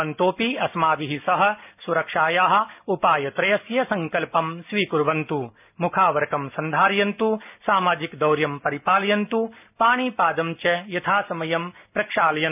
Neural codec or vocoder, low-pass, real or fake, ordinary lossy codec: none; 3.6 kHz; real; none